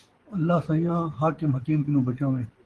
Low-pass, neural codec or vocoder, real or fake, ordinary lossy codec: 10.8 kHz; vocoder, 24 kHz, 100 mel bands, Vocos; fake; Opus, 16 kbps